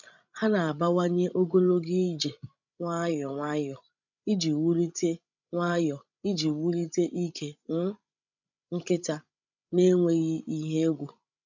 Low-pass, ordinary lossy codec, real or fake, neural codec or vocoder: 7.2 kHz; none; fake; codec, 16 kHz, 16 kbps, FreqCodec, larger model